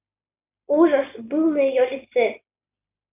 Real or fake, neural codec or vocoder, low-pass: real; none; 3.6 kHz